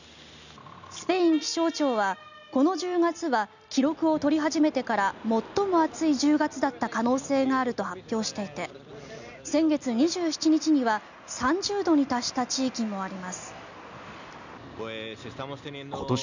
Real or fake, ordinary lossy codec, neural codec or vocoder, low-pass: real; none; none; 7.2 kHz